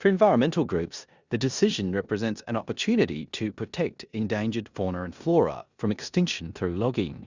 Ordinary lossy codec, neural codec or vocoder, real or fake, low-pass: Opus, 64 kbps; codec, 16 kHz in and 24 kHz out, 0.9 kbps, LongCat-Audio-Codec, four codebook decoder; fake; 7.2 kHz